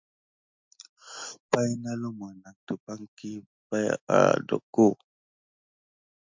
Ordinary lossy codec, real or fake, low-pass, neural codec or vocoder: MP3, 64 kbps; real; 7.2 kHz; none